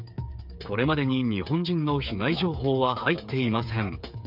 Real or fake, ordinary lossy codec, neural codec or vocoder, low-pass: fake; none; codec, 16 kHz, 8 kbps, FreqCodec, smaller model; 5.4 kHz